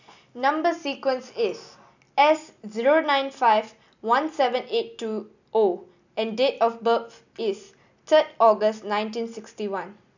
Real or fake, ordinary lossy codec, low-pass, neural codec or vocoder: real; none; 7.2 kHz; none